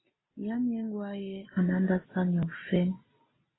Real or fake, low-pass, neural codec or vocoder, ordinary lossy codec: real; 7.2 kHz; none; AAC, 16 kbps